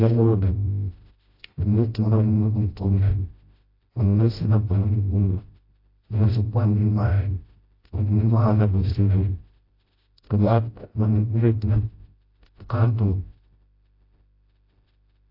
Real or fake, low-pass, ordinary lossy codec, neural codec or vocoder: fake; 5.4 kHz; AAC, 32 kbps; codec, 16 kHz, 0.5 kbps, FreqCodec, smaller model